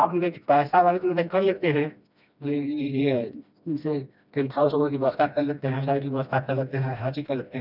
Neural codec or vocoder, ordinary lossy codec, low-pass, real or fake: codec, 16 kHz, 1 kbps, FreqCodec, smaller model; none; 5.4 kHz; fake